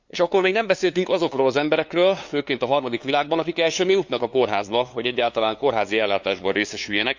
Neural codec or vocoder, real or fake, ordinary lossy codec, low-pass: codec, 16 kHz, 2 kbps, FunCodec, trained on LibriTTS, 25 frames a second; fake; Opus, 64 kbps; 7.2 kHz